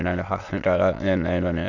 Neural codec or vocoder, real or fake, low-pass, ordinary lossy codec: autoencoder, 22.05 kHz, a latent of 192 numbers a frame, VITS, trained on many speakers; fake; 7.2 kHz; none